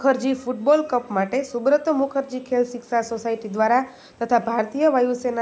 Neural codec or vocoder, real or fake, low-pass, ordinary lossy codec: none; real; none; none